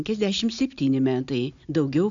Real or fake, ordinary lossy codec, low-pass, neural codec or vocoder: real; AAC, 64 kbps; 7.2 kHz; none